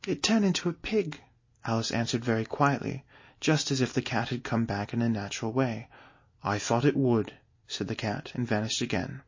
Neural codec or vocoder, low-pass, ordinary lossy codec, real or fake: none; 7.2 kHz; MP3, 32 kbps; real